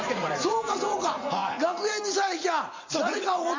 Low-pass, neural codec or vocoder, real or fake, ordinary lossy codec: 7.2 kHz; vocoder, 44.1 kHz, 80 mel bands, Vocos; fake; MP3, 64 kbps